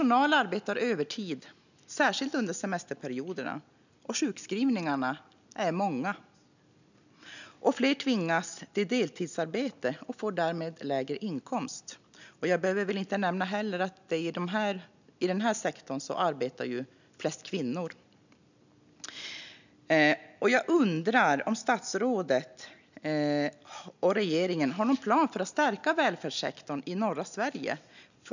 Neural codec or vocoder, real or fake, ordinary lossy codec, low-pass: none; real; none; 7.2 kHz